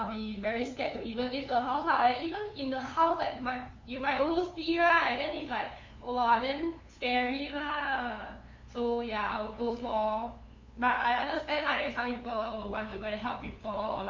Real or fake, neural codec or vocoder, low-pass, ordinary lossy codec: fake; codec, 16 kHz, 2 kbps, FunCodec, trained on LibriTTS, 25 frames a second; 7.2 kHz; MP3, 48 kbps